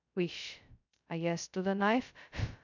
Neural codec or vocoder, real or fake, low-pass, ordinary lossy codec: codec, 16 kHz, 0.2 kbps, FocalCodec; fake; 7.2 kHz; none